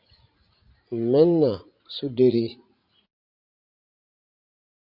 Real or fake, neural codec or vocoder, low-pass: fake; vocoder, 44.1 kHz, 80 mel bands, Vocos; 5.4 kHz